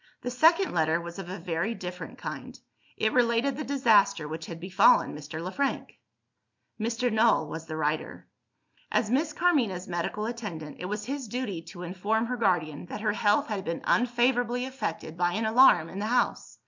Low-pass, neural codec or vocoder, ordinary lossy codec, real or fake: 7.2 kHz; none; MP3, 64 kbps; real